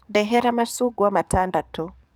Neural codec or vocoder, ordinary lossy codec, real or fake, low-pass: codec, 44.1 kHz, 7.8 kbps, DAC; none; fake; none